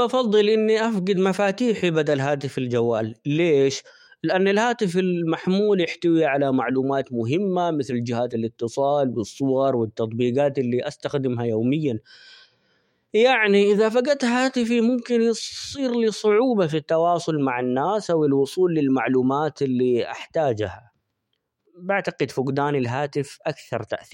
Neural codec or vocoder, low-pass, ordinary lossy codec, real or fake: autoencoder, 48 kHz, 128 numbers a frame, DAC-VAE, trained on Japanese speech; 19.8 kHz; MP3, 64 kbps; fake